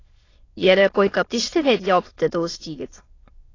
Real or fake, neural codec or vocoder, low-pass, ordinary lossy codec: fake; autoencoder, 22.05 kHz, a latent of 192 numbers a frame, VITS, trained on many speakers; 7.2 kHz; AAC, 32 kbps